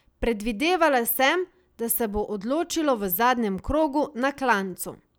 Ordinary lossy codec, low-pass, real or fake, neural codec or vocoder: none; none; real; none